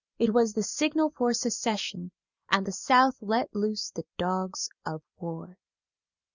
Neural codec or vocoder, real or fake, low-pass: none; real; 7.2 kHz